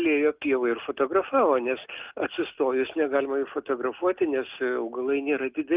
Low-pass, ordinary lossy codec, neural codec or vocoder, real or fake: 3.6 kHz; Opus, 24 kbps; none; real